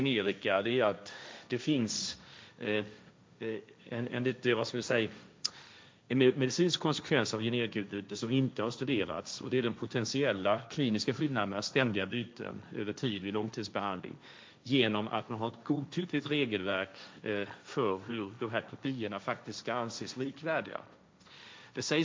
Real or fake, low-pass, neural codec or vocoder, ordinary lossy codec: fake; none; codec, 16 kHz, 1.1 kbps, Voila-Tokenizer; none